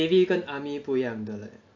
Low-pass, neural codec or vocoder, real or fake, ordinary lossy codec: 7.2 kHz; none; real; AAC, 48 kbps